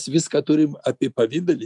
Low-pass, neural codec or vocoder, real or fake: 10.8 kHz; none; real